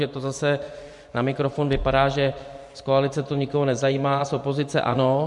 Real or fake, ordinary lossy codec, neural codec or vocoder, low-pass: fake; MP3, 64 kbps; vocoder, 24 kHz, 100 mel bands, Vocos; 10.8 kHz